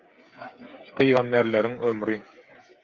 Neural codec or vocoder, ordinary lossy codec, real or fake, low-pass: codec, 16 kHz, 8 kbps, FreqCodec, smaller model; Opus, 24 kbps; fake; 7.2 kHz